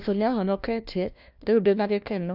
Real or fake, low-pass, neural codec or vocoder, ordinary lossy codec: fake; 5.4 kHz; codec, 16 kHz, 1 kbps, FunCodec, trained on LibriTTS, 50 frames a second; none